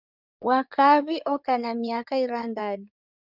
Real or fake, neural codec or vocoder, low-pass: fake; codec, 16 kHz in and 24 kHz out, 2.2 kbps, FireRedTTS-2 codec; 5.4 kHz